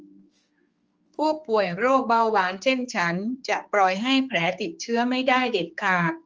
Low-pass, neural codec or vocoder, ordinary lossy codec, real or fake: 7.2 kHz; codec, 16 kHz, 4 kbps, X-Codec, HuBERT features, trained on general audio; Opus, 24 kbps; fake